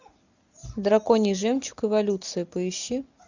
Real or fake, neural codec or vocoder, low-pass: real; none; 7.2 kHz